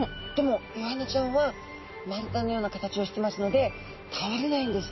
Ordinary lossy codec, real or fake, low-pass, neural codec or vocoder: MP3, 24 kbps; real; 7.2 kHz; none